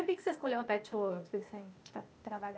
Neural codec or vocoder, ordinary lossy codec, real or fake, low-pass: codec, 16 kHz, 0.8 kbps, ZipCodec; none; fake; none